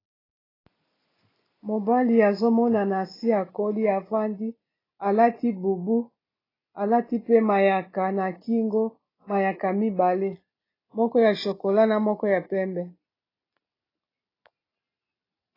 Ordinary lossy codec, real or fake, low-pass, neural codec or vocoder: AAC, 24 kbps; real; 5.4 kHz; none